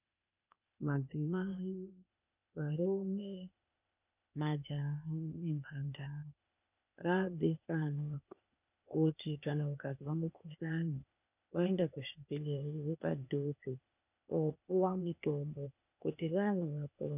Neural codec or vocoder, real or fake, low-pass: codec, 16 kHz, 0.8 kbps, ZipCodec; fake; 3.6 kHz